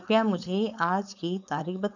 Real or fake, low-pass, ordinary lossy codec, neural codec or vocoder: fake; 7.2 kHz; none; codec, 16 kHz, 4.8 kbps, FACodec